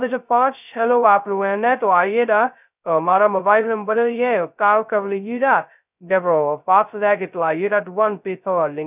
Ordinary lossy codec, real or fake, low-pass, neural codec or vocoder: none; fake; 3.6 kHz; codec, 16 kHz, 0.2 kbps, FocalCodec